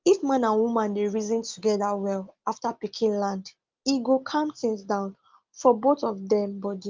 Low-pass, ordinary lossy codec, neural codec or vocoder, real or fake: 7.2 kHz; Opus, 32 kbps; none; real